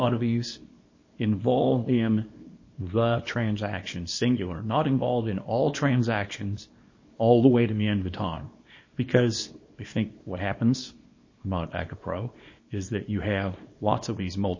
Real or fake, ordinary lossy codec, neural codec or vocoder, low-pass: fake; MP3, 32 kbps; codec, 24 kHz, 0.9 kbps, WavTokenizer, small release; 7.2 kHz